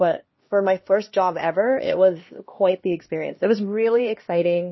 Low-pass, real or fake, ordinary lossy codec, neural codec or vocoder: 7.2 kHz; fake; MP3, 24 kbps; codec, 16 kHz, 1 kbps, X-Codec, HuBERT features, trained on LibriSpeech